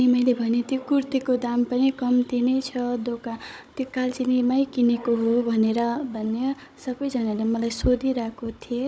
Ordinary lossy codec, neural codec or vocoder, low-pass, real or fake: none; codec, 16 kHz, 16 kbps, FunCodec, trained on Chinese and English, 50 frames a second; none; fake